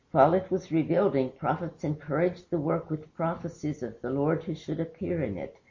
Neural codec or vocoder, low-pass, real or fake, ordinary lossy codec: none; 7.2 kHz; real; MP3, 32 kbps